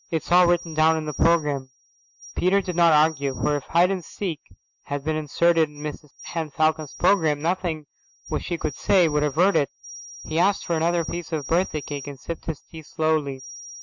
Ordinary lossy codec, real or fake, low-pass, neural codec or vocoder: MP3, 64 kbps; real; 7.2 kHz; none